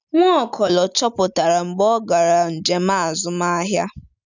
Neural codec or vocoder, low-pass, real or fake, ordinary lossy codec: none; 7.2 kHz; real; none